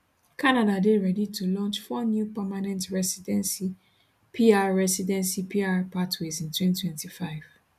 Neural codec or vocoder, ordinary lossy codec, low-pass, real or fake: none; none; 14.4 kHz; real